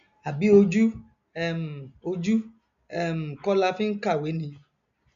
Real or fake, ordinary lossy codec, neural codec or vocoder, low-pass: real; none; none; 7.2 kHz